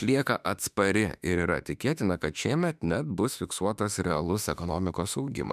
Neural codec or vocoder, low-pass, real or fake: autoencoder, 48 kHz, 32 numbers a frame, DAC-VAE, trained on Japanese speech; 14.4 kHz; fake